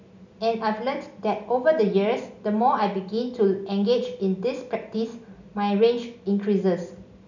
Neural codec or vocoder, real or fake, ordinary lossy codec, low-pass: none; real; none; 7.2 kHz